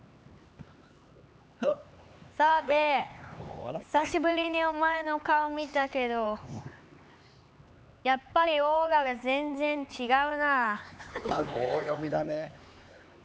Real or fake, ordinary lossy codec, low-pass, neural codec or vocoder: fake; none; none; codec, 16 kHz, 4 kbps, X-Codec, HuBERT features, trained on LibriSpeech